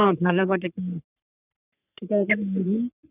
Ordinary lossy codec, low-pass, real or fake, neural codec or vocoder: none; 3.6 kHz; fake; codec, 44.1 kHz, 3.4 kbps, Pupu-Codec